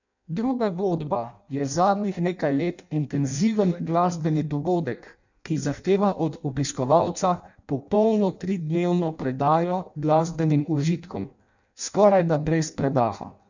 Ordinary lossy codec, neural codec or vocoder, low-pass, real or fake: none; codec, 16 kHz in and 24 kHz out, 0.6 kbps, FireRedTTS-2 codec; 7.2 kHz; fake